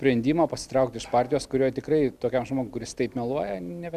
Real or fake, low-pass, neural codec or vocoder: real; 14.4 kHz; none